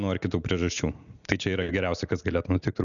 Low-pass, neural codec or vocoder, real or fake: 7.2 kHz; none; real